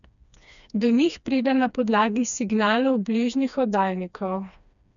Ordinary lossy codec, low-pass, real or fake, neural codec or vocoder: none; 7.2 kHz; fake; codec, 16 kHz, 2 kbps, FreqCodec, smaller model